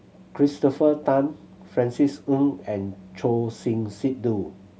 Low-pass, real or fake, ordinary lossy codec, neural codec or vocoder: none; real; none; none